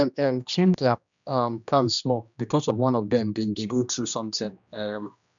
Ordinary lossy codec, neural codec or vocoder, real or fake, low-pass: none; codec, 16 kHz, 1 kbps, X-Codec, HuBERT features, trained on general audio; fake; 7.2 kHz